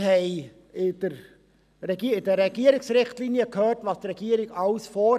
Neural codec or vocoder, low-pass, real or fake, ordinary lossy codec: none; 14.4 kHz; real; none